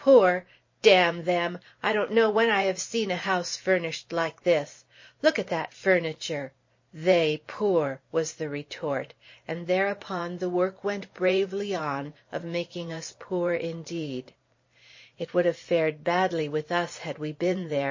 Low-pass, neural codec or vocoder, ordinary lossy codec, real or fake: 7.2 kHz; vocoder, 44.1 kHz, 128 mel bands every 512 samples, BigVGAN v2; MP3, 32 kbps; fake